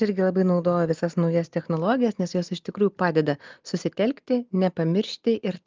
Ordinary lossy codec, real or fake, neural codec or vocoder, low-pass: Opus, 24 kbps; real; none; 7.2 kHz